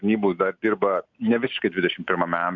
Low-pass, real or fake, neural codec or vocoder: 7.2 kHz; real; none